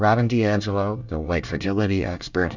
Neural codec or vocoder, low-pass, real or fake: codec, 24 kHz, 1 kbps, SNAC; 7.2 kHz; fake